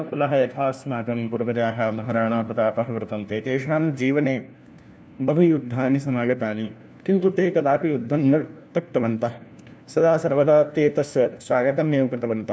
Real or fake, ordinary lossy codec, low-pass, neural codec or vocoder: fake; none; none; codec, 16 kHz, 1 kbps, FunCodec, trained on LibriTTS, 50 frames a second